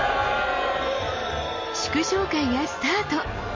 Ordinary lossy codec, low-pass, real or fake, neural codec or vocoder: MP3, 32 kbps; 7.2 kHz; real; none